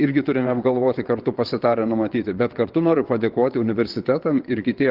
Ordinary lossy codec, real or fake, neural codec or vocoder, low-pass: Opus, 16 kbps; fake; vocoder, 22.05 kHz, 80 mel bands, WaveNeXt; 5.4 kHz